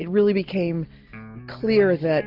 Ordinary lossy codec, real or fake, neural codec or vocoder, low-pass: AAC, 48 kbps; real; none; 5.4 kHz